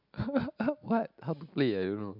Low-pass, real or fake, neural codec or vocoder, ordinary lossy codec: 5.4 kHz; real; none; none